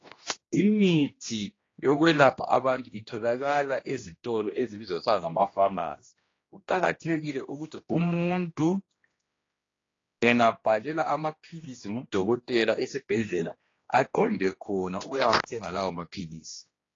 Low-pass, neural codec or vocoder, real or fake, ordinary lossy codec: 7.2 kHz; codec, 16 kHz, 1 kbps, X-Codec, HuBERT features, trained on general audio; fake; AAC, 32 kbps